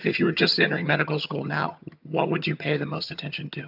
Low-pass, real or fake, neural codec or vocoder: 5.4 kHz; fake; vocoder, 22.05 kHz, 80 mel bands, HiFi-GAN